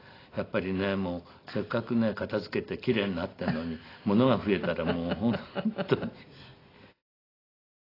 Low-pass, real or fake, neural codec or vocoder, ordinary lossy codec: 5.4 kHz; real; none; AAC, 24 kbps